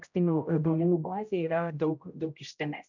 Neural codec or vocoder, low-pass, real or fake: codec, 16 kHz, 0.5 kbps, X-Codec, HuBERT features, trained on general audio; 7.2 kHz; fake